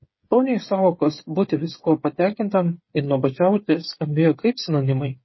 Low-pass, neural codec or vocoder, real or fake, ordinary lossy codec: 7.2 kHz; codec, 16 kHz, 8 kbps, FreqCodec, smaller model; fake; MP3, 24 kbps